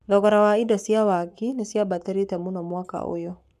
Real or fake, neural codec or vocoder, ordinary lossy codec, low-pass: fake; codec, 44.1 kHz, 7.8 kbps, Pupu-Codec; none; 14.4 kHz